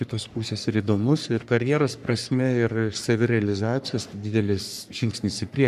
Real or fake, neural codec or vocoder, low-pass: fake; codec, 44.1 kHz, 3.4 kbps, Pupu-Codec; 14.4 kHz